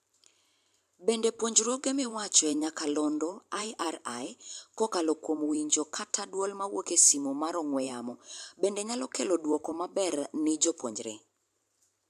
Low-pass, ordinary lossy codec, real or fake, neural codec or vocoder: 14.4 kHz; AAC, 96 kbps; fake; vocoder, 44.1 kHz, 128 mel bands every 512 samples, BigVGAN v2